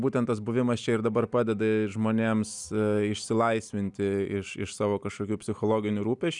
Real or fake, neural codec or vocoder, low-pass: real; none; 10.8 kHz